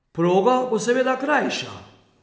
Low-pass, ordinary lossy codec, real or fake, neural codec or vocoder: none; none; real; none